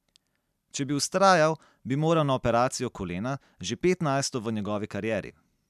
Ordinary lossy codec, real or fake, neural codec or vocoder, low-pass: none; real; none; 14.4 kHz